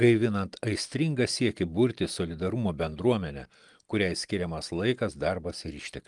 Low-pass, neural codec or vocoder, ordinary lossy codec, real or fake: 10.8 kHz; none; Opus, 32 kbps; real